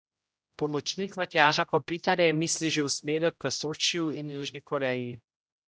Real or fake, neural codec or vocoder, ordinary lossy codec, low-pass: fake; codec, 16 kHz, 0.5 kbps, X-Codec, HuBERT features, trained on general audio; none; none